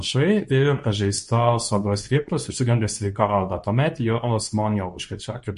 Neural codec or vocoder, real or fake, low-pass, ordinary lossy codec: codec, 24 kHz, 0.9 kbps, WavTokenizer, medium speech release version 2; fake; 10.8 kHz; MP3, 48 kbps